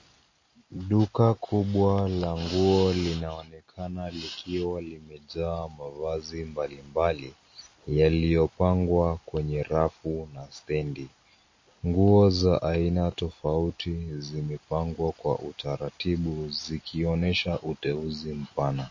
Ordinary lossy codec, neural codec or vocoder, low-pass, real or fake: MP3, 32 kbps; none; 7.2 kHz; real